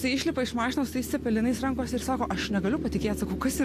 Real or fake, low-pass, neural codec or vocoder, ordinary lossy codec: real; 14.4 kHz; none; AAC, 64 kbps